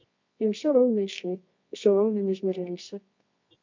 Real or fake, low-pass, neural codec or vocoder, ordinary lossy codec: fake; 7.2 kHz; codec, 24 kHz, 0.9 kbps, WavTokenizer, medium music audio release; MP3, 48 kbps